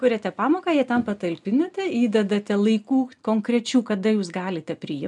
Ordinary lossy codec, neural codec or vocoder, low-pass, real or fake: MP3, 96 kbps; none; 10.8 kHz; real